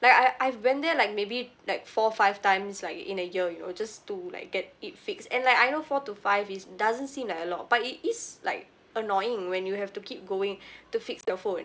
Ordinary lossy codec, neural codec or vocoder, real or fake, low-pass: none; none; real; none